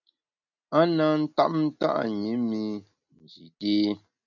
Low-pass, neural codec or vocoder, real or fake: 7.2 kHz; none; real